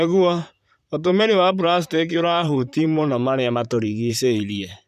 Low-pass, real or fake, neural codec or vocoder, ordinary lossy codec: 14.4 kHz; fake; vocoder, 44.1 kHz, 128 mel bands, Pupu-Vocoder; none